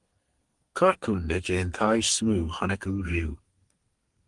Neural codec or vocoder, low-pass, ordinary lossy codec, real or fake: codec, 32 kHz, 1.9 kbps, SNAC; 10.8 kHz; Opus, 24 kbps; fake